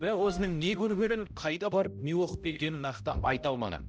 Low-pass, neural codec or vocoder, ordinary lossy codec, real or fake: none; codec, 16 kHz, 0.5 kbps, X-Codec, HuBERT features, trained on balanced general audio; none; fake